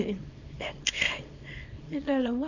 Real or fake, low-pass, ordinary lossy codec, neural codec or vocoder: fake; 7.2 kHz; none; codec, 24 kHz, 0.9 kbps, WavTokenizer, small release